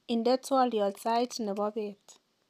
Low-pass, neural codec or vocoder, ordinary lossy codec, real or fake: 14.4 kHz; none; none; real